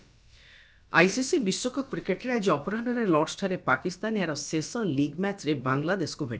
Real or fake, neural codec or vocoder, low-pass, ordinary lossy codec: fake; codec, 16 kHz, about 1 kbps, DyCAST, with the encoder's durations; none; none